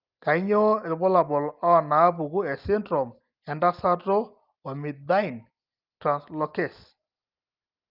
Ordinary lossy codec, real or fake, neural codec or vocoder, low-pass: Opus, 32 kbps; real; none; 5.4 kHz